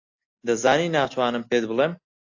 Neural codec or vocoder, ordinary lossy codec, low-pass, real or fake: none; AAC, 48 kbps; 7.2 kHz; real